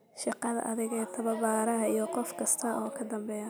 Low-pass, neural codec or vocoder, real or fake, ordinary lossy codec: none; none; real; none